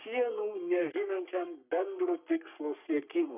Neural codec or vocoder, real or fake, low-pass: codec, 44.1 kHz, 3.4 kbps, Pupu-Codec; fake; 3.6 kHz